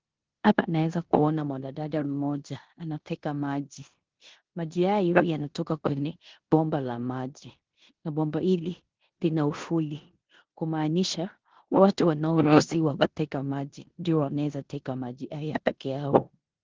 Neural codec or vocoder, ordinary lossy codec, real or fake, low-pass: codec, 16 kHz in and 24 kHz out, 0.9 kbps, LongCat-Audio-Codec, four codebook decoder; Opus, 16 kbps; fake; 7.2 kHz